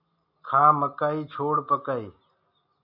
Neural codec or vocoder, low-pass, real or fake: none; 5.4 kHz; real